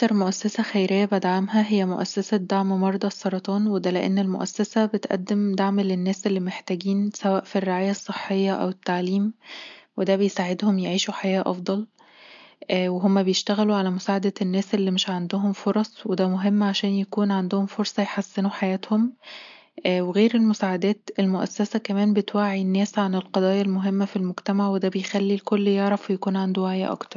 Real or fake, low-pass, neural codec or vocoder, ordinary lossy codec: real; 7.2 kHz; none; MP3, 64 kbps